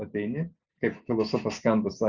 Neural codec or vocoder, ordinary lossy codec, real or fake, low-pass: none; Opus, 64 kbps; real; 7.2 kHz